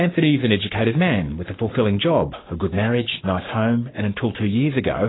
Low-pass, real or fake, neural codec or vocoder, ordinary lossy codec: 7.2 kHz; fake; codec, 44.1 kHz, 7.8 kbps, Pupu-Codec; AAC, 16 kbps